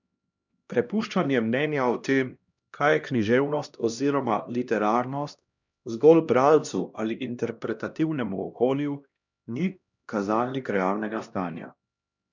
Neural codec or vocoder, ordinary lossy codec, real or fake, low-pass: codec, 16 kHz, 1 kbps, X-Codec, HuBERT features, trained on LibriSpeech; none; fake; 7.2 kHz